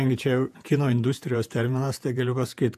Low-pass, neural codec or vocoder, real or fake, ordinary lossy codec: 14.4 kHz; vocoder, 48 kHz, 128 mel bands, Vocos; fake; AAC, 96 kbps